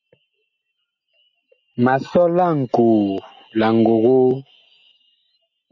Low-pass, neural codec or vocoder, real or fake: 7.2 kHz; none; real